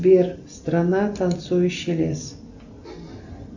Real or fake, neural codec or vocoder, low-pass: real; none; 7.2 kHz